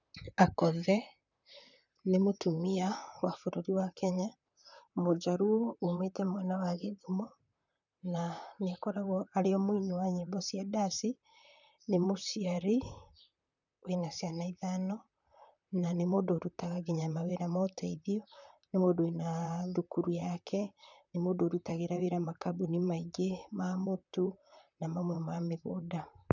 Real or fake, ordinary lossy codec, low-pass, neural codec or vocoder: fake; none; 7.2 kHz; vocoder, 44.1 kHz, 128 mel bands, Pupu-Vocoder